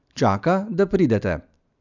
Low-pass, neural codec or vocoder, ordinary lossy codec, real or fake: 7.2 kHz; none; none; real